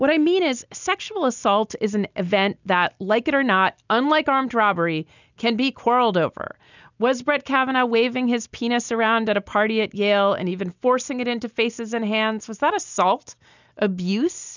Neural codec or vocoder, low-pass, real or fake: none; 7.2 kHz; real